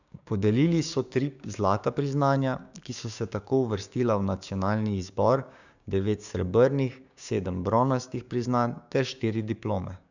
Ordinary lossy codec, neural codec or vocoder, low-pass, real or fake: none; codec, 16 kHz, 6 kbps, DAC; 7.2 kHz; fake